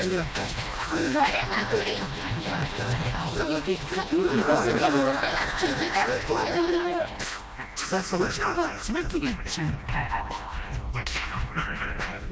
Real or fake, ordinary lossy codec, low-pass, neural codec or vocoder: fake; none; none; codec, 16 kHz, 1 kbps, FreqCodec, smaller model